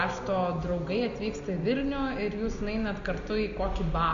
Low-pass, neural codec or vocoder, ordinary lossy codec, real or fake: 7.2 kHz; none; Opus, 64 kbps; real